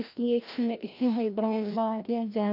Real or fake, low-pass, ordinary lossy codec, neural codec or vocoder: fake; 5.4 kHz; none; codec, 16 kHz, 1 kbps, FreqCodec, larger model